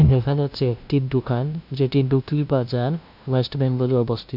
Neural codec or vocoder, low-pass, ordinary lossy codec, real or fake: codec, 16 kHz, 0.5 kbps, FunCodec, trained on LibriTTS, 25 frames a second; 5.4 kHz; none; fake